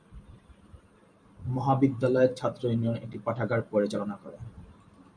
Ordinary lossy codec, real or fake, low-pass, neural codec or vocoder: MP3, 96 kbps; fake; 9.9 kHz; vocoder, 44.1 kHz, 128 mel bands every 512 samples, BigVGAN v2